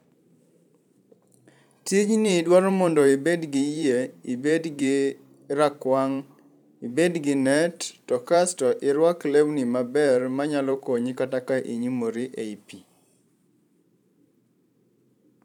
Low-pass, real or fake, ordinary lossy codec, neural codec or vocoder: 19.8 kHz; fake; none; vocoder, 44.1 kHz, 128 mel bands every 512 samples, BigVGAN v2